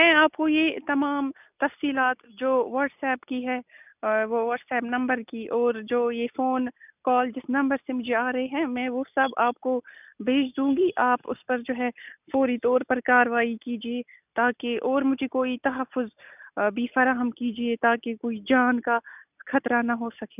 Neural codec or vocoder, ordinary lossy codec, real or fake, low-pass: none; none; real; 3.6 kHz